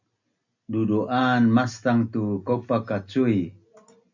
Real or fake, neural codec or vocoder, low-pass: real; none; 7.2 kHz